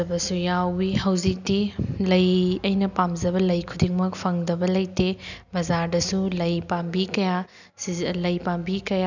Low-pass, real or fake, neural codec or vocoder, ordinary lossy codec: 7.2 kHz; real; none; none